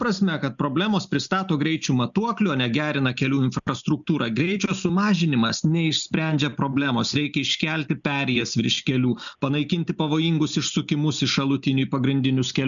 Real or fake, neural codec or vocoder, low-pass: real; none; 7.2 kHz